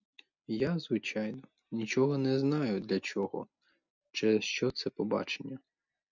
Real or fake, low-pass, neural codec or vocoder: real; 7.2 kHz; none